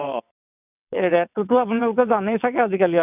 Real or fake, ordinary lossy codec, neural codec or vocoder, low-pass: fake; none; vocoder, 22.05 kHz, 80 mel bands, WaveNeXt; 3.6 kHz